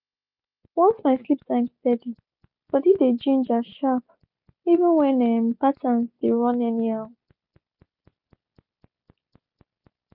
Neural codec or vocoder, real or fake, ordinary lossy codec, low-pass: none; real; none; 5.4 kHz